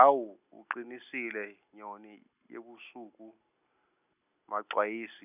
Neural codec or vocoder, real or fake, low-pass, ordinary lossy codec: none; real; 3.6 kHz; none